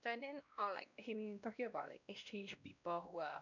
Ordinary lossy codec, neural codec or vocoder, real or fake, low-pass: none; codec, 16 kHz, 1 kbps, X-Codec, WavLM features, trained on Multilingual LibriSpeech; fake; 7.2 kHz